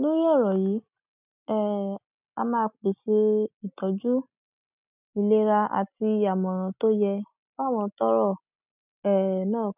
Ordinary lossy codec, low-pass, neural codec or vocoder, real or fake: none; 3.6 kHz; none; real